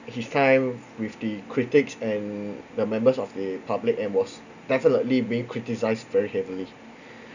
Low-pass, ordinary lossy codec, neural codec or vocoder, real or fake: 7.2 kHz; none; none; real